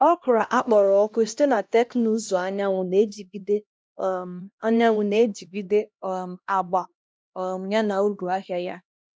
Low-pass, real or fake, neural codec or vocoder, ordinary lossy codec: none; fake; codec, 16 kHz, 1 kbps, X-Codec, HuBERT features, trained on LibriSpeech; none